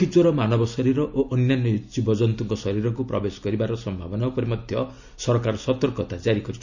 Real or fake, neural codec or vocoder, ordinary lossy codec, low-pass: real; none; none; 7.2 kHz